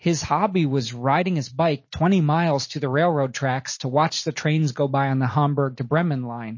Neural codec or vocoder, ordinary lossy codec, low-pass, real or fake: none; MP3, 32 kbps; 7.2 kHz; real